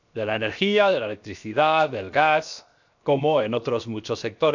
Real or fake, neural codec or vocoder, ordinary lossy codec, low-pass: fake; codec, 16 kHz, 0.7 kbps, FocalCodec; none; 7.2 kHz